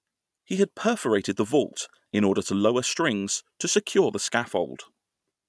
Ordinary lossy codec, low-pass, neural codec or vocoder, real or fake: none; none; none; real